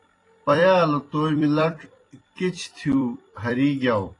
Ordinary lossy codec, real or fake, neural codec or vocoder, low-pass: AAC, 32 kbps; fake; vocoder, 44.1 kHz, 128 mel bands every 256 samples, BigVGAN v2; 10.8 kHz